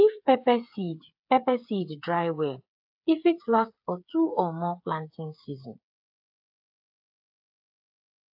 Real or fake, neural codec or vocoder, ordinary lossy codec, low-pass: fake; codec, 16 kHz, 8 kbps, FreqCodec, smaller model; AAC, 48 kbps; 5.4 kHz